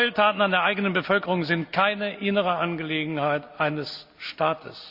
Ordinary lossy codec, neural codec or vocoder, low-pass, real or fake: Opus, 64 kbps; none; 5.4 kHz; real